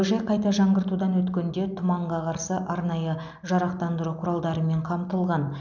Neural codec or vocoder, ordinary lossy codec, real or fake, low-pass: none; none; real; 7.2 kHz